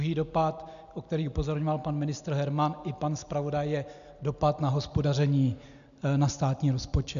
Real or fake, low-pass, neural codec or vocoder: real; 7.2 kHz; none